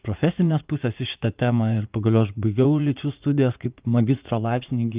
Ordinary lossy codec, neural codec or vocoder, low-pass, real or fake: Opus, 24 kbps; vocoder, 44.1 kHz, 80 mel bands, Vocos; 3.6 kHz; fake